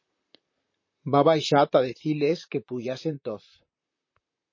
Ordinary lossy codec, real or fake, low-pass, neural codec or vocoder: MP3, 32 kbps; fake; 7.2 kHz; vocoder, 44.1 kHz, 128 mel bands, Pupu-Vocoder